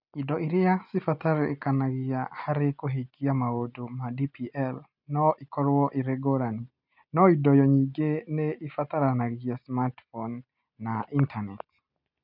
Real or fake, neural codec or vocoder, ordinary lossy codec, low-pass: real; none; none; 5.4 kHz